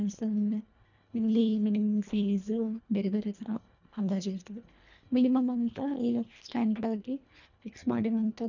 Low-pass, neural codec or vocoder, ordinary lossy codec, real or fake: 7.2 kHz; codec, 24 kHz, 1.5 kbps, HILCodec; none; fake